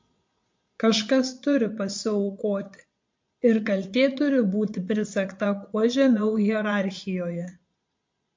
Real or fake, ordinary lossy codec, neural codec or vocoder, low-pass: fake; MP3, 48 kbps; vocoder, 22.05 kHz, 80 mel bands, Vocos; 7.2 kHz